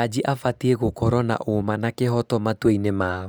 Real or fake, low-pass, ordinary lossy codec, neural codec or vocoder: real; none; none; none